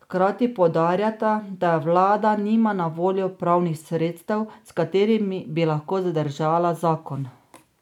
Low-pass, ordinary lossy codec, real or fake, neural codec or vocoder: 19.8 kHz; none; real; none